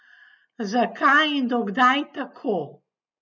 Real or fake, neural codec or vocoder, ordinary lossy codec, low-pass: real; none; none; 7.2 kHz